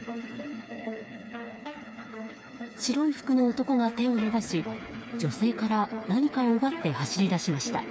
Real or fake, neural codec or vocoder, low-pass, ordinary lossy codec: fake; codec, 16 kHz, 4 kbps, FreqCodec, smaller model; none; none